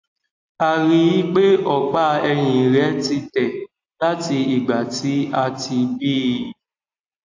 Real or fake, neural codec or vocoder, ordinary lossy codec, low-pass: real; none; AAC, 48 kbps; 7.2 kHz